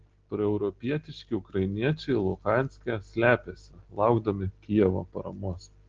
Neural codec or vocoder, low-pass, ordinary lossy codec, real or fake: none; 7.2 kHz; Opus, 16 kbps; real